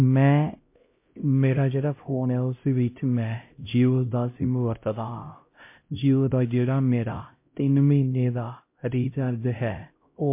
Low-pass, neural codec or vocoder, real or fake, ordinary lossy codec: 3.6 kHz; codec, 16 kHz, 0.5 kbps, X-Codec, HuBERT features, trained on LibriSpeech; fake; MP3, 24 kbps